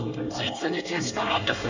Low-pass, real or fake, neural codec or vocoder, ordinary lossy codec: 7.2 kHz; fake; codec, 24 kHz, 1 kbps, SNAC; Opus, 64 kbps